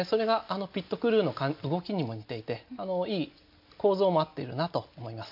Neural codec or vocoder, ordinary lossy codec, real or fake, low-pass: none; MP3, 48 kbps; real; 5.4 kHz